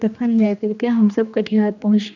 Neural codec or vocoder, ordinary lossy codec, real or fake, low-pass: codec, 16 kHz, 2 kbps, X-Codec, HuBERT features, trained on general audio; none; fake; 7.2 kHz